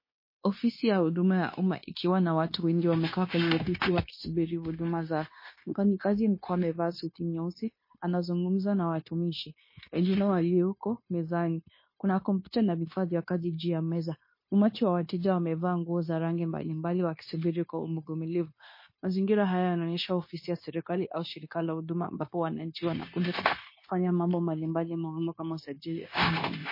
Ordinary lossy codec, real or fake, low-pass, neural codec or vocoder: MP3, 24 kbps; fake; 5.4 kHz; codec, 16 kHz, 0.9 kbps, LongCat-Audio-Codec